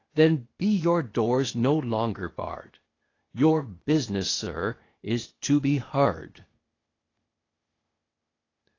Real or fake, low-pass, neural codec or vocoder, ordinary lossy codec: fake; 7.2 kHz; codec, 16 kHz, 0.8 kbps, ZipCodec; AAC, 32 kbps